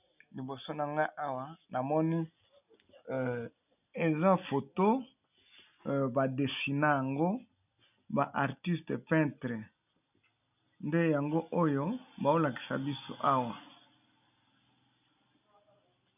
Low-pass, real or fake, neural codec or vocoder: 3.6 kHz; real; none